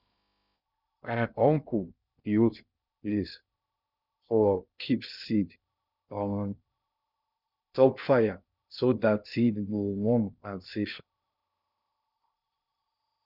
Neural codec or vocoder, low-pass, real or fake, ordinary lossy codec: codec, 16 kHz in and 24 kHz out, 0.6 kbps, FocalCodec, streaming, 2048 codes; 5.4 kHz; fake; none